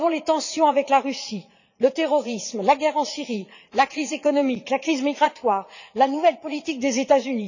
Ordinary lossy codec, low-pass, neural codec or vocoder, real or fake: none; 7.2 kHz; vocoder, 44.1 kHz, 80 mel bands, Vocos; fake